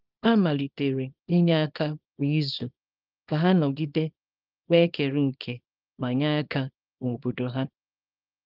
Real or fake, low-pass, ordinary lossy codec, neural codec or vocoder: fake; 5.4 kHz; Opus, 32 kbps; codec, 24 kHz, 0.9 kbps, WavTokenizer, small release